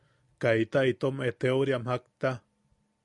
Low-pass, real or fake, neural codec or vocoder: 10.8 kHz; real; none